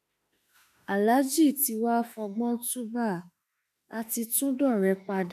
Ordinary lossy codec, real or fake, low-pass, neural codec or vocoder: none; fake; 14.4 kHz; autoencoder, 48 kHz, 32 numbers a frame, DAC-VAE, trained on Japanese speech